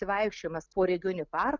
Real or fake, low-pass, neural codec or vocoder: real; 7.2 kHz; none